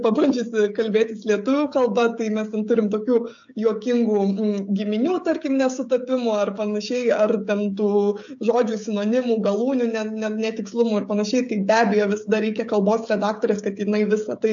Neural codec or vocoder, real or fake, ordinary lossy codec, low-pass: codec, 16 kHz, 16 kbps, FreqCodec, smaller model; fake; AAC, 64 kbps; 7.2 kHz